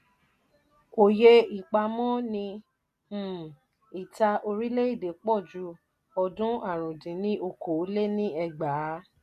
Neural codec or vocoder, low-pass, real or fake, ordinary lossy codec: none; 14.4 kHz; real; none